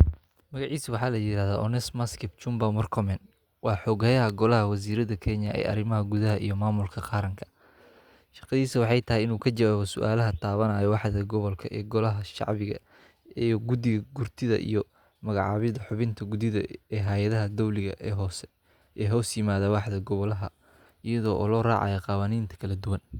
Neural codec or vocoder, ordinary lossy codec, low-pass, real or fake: none; Opus, 64 kbps; 19.8 kHz; real